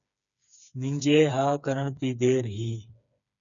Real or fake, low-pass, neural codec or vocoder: fake; 7.2 kHz; codec, 16 kHz, 4 kbps, FreqCodec, smaller model